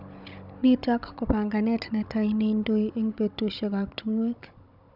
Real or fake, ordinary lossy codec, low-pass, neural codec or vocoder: fake; none; 5.4 kHz; codec, 16 kHz, 16 kbps, FunCodec, trained on Chinese and English, 50 frames a second